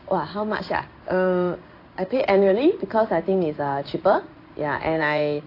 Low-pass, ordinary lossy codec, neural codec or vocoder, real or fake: 5.4 kHz; AAC, 32 kbps; codec, 16 kHz in and 24 kHz out, 1 kbps, XY-Tokenizer; fake